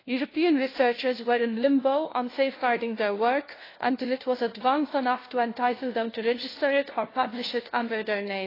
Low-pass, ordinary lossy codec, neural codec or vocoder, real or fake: 5.4 kHz; AAC, 24 kbps; codec, 16 kHz, 1 kbps, FunCodec, trained on LibriTTS, 50 frames a second; fake